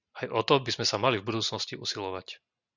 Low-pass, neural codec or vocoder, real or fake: 7.2 kHz; none; real